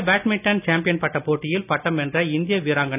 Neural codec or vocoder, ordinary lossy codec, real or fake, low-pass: none; none; real; 3.6 kHz